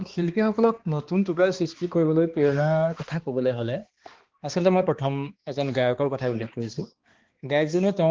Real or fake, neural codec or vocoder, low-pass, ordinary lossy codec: fake; codec, 16 kHz, 2 kbps, X-Codec, HuBERT features, trained on balanced general audio; 7.2 kHz; Opus, 16 kbps